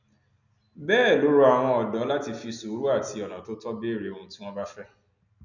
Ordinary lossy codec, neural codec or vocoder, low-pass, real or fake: none; none; 7.2 kHz; real